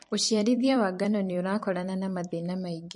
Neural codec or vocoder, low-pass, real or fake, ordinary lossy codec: vocoder, 44.1 kHz, 128 mel bands every 256 samples, BigVGAN v2; 10.8 kHz; fake; MP3, 48 kbps